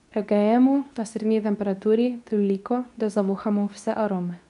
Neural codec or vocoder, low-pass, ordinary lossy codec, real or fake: codec, 24 kHz, 0.9 kbps, WavTokenizer, medium speech release version 2; 10.8 kHz; none; fake